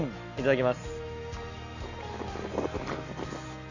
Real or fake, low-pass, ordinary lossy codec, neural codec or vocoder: real; 7.2 kHz; none; none